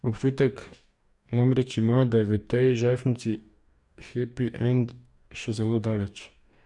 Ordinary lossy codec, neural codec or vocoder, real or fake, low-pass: none; codec, 44.1 kHz, 2.6 kbps, DAC; fake; 10.8 kHz